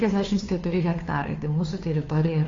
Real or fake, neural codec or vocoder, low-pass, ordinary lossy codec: fake; codec, 16 kHz, 2 kbps, FunCodec, trained on Chinese and English, 25 frames a second; 7.2 kHz; AAC, 32 kbps